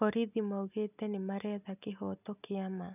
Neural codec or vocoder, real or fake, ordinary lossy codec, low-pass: none; real; none; 3.6 kHz